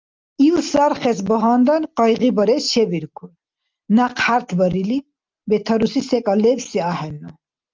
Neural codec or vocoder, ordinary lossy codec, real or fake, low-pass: none; Opus, 32 kbps; real; 7.2 kHz